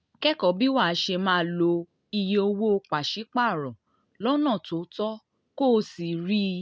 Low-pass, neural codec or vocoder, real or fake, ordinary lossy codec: none; none; real; none